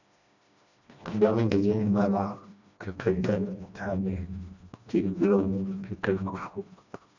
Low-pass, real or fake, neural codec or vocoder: 7.2 kHz; fake; codec, 16 kHz, 1 kbps, FreqCodec, smaller model